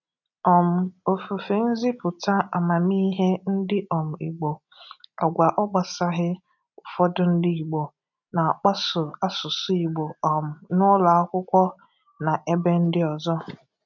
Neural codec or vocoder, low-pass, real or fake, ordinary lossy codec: none; 7.2 kHz; real; none